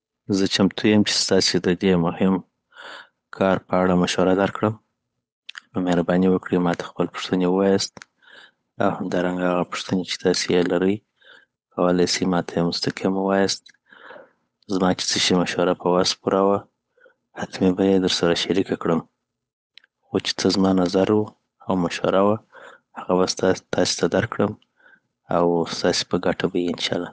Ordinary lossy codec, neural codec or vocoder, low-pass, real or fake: none; codec, 16 kHz, 8 kbps, FunCodec, trained on Chinese and English, 25 frames a second; none; fake